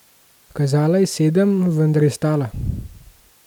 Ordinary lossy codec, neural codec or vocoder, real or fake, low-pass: none; none; real; 19.8 kHz